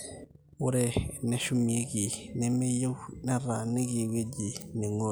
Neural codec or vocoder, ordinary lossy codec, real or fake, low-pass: none; none; real; none